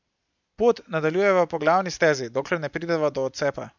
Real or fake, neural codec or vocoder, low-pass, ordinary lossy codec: real; none; 7.2 kHz; none